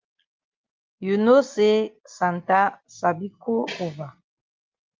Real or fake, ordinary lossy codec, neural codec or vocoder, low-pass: real; Opus, 32 kbps; none; 7.2 kHz